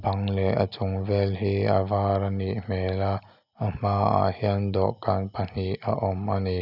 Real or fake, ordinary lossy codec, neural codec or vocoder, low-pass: real; none; none; 5.4 kHz